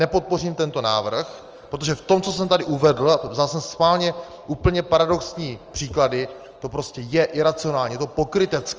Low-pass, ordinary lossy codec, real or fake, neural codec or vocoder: 7.2 kHz; Opus, 24 kbps; real; none